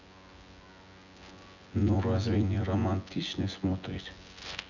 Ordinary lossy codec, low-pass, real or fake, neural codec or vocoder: none; 7.2 kHz; fake; vocoder, 24 kHz, 100 mel bands, Vocos